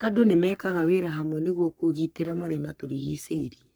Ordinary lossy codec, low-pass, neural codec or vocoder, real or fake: none; none; codec, 44.1 kHz, 3.4 kbps, Pupu-Codec; fake